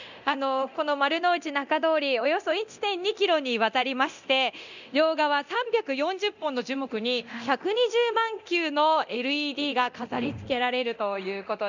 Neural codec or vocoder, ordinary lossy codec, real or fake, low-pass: codec, 24 kHz, 0.9 kbps, DualCodec; none; fake; 7.2 kHz